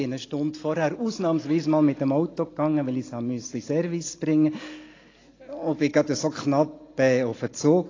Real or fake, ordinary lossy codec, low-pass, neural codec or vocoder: real; AAC, 32 kbps; 7.2 kHz; none